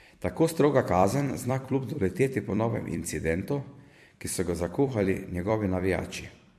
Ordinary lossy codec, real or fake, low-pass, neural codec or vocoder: MP3, 64 kbps; fake; 14.4 kHz; vocoder, 44.1 kHz, 128 mel bands every 256 samples, BigVGAN v2